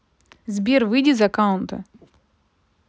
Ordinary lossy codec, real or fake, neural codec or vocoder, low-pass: none; real; none; none